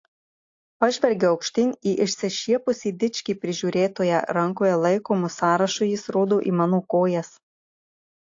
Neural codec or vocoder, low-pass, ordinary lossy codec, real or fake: none; 7.2 kHz; AAC, 48 kbps; real